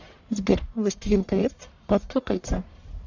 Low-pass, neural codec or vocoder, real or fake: 7.2 kHz; codec, 44.1 kHz, 1.7 kbps, Pupu-Codec; fake